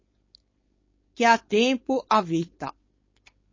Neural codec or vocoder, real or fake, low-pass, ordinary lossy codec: codec, 16 kHz, 4.8 kbps, FACodec; fake; 7.2 kHz; MP3, 32 kbps